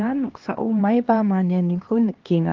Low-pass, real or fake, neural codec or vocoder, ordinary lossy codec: 7.2 kHz; fake; codec, 16 kHz, 2 kbps, X-Codec, HuBERT features, trained on LibriSpeech; Opus, 16 kbps